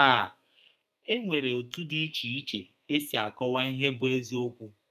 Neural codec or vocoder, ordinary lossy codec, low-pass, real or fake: codec, 32 kHz, 1.9 kbps, SNAC; none; 14.4 kHz; fake